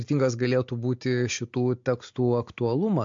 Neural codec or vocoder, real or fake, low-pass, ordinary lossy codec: none; real; 7.2 kHz; MP3, 48 kbps